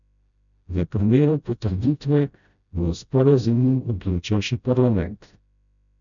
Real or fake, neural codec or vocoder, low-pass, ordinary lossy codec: fake; codec, 16 kHz, 0.5 kbps, FreqCodec, smaller model; 7.2 kHz; none